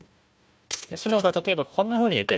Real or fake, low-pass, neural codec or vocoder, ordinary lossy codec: fake; none; codec, 16 kHz, 1 kbps, FunCodec, trained on Chinese and English, 50 frames a second; none